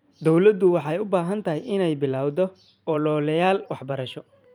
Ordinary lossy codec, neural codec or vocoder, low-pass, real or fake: none; vocoder, 44.1 kHz, 128 mel bands every 512 samples, BigVGAN v2; 19.8 kHz; fake